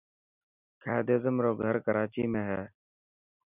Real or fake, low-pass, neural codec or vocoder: real; 3.6 kHz; none